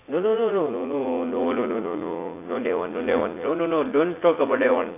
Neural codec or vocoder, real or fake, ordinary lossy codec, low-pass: vocoder, 44.1 kHz, 80 mel bands, Vocos; fake; AAC, 24 kbps; 3.6 kHz